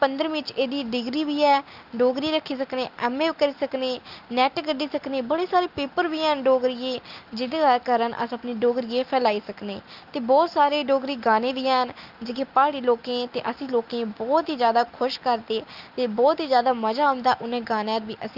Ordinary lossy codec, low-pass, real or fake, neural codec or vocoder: Opus, 24 kbps; 5.4 kHz; real; none